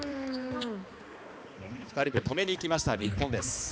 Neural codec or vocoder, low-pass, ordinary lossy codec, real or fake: codec, 16 kHz, 2 kbps, X-Codec, HuBERT features, trained on balanced general audio; none; none; fake